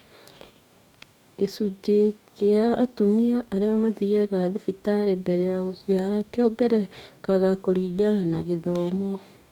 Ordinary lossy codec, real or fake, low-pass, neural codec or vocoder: none; fake; 19.8 kHz; codec, 44.1 kHz, 2.6 kbps, DAC